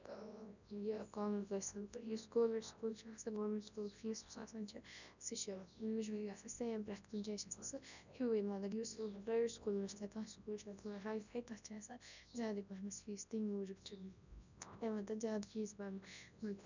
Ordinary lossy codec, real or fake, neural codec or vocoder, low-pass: none; fake; codec, 24 kHz, 0.9 kbps, WavTokenizer, large speech release; 7.2 kHz